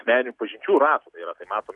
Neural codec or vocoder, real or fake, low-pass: none; real; 10.8 kHz